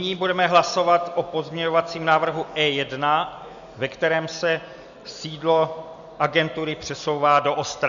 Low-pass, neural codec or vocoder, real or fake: 7.2 kHz; none; real